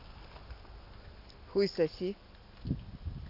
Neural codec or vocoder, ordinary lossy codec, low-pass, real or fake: none; none; 5.4 kHz; real